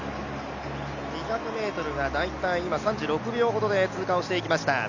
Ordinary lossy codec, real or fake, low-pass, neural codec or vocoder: none; real; 7.2 kHz; none